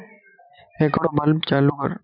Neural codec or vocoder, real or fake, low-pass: vocoder, 44.1 kHz, 128 mel bands every 256 samples, BigVGAN v2; fake; 5.4 kHz